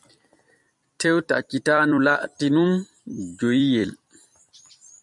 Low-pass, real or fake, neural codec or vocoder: 10.8 kHz; fake; vocoder, 44.1 kHz, 128 mel bands every 256 samples, BigVGAN v2